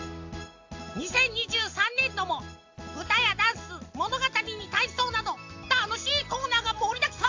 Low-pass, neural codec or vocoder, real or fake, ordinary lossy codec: 7.2 kHz; none; real; AAC, 48 kbps